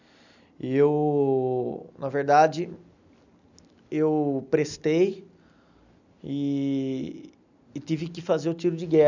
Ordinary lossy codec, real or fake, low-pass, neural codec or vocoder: none; real; 7.2 kHz; none